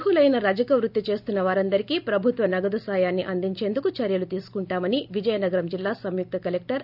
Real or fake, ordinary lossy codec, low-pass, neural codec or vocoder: real; none; 5.4 kHz; none